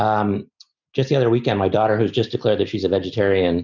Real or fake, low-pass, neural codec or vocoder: real; 7.2 kHz; none